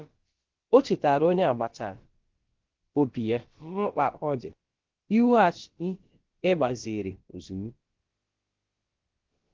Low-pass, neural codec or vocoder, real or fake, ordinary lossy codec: 7.2 kHz; codec, 16 kHz, about 1 kbps, DyCAST, with the encoder's durations; fake; Opus, 16 kbps